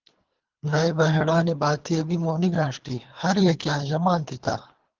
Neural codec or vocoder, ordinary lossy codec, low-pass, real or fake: codec, 24 kHz, 3 kbps, HILCodec; Opus, 16 kbps; 7.2 kHz; fake